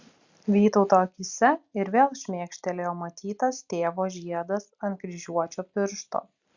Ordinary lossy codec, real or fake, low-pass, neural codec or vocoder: Opus, 64 kbps; real; 7.2 kHz; none